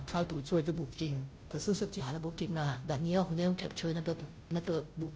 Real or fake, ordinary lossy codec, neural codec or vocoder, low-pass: fake; none; codec, 16 kHz, 0.5 kbps, FunCodec, trained on Chinese and English, 25 frames a second; none